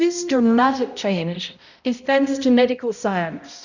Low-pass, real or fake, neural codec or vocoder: 7.2 kHz; fake; codec, 16 kHz, 0.5 kbps, X-Codec, HuBERT features, trained on balanced general audio